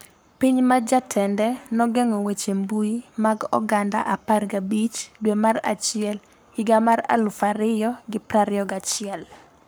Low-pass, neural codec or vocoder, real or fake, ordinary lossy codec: none; codec, 44.1 kHz, 7.8 kbps, Pupu-Codec; fake; none